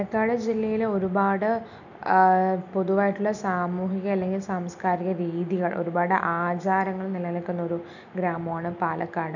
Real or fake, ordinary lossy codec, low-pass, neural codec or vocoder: real; none; 7.2 kHz; none